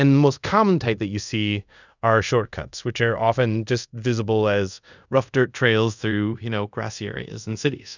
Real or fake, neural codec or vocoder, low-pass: fake; codec, 24 kHz, 0.5 kbps, DualCodec; 7.2 kHz